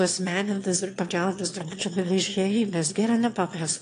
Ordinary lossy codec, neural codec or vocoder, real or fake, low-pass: AAC, 48 kbps; autoencoder, 22.05 kHz, a latent of 192 numbers a frame, VITS, trained on one speaker; fake; 9.9 kHz